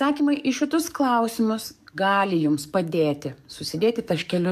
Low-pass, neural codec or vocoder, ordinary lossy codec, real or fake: 14.4 kHz; codec, 44.1 kHz, 7.8 kbps, DAC; MP3, 96 kbps; fake